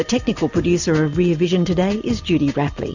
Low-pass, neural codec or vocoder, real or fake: 7.2 kHz; none; real